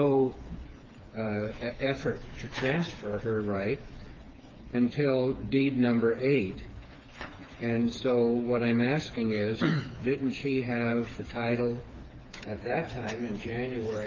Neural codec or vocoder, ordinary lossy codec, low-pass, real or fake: codec, 16 kHz, 4 kbps, FreqCodec, smaller model; Opus, 32 kbps; 7.2 kHz; fake